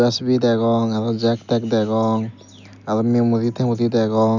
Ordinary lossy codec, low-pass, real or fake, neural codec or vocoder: none; 7.2 kHz; real; none